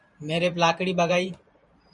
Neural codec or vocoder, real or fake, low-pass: vocoder, 44.1 kHz, 128 mel bands every 512 samples, BigVGAN v2; fake; 10.8 kHz